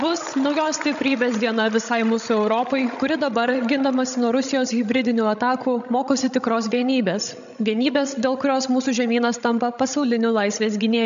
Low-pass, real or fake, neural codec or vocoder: 7.2 kHz; fake; codec, 16 kHz, 16 kbps, FreqCodec, larger model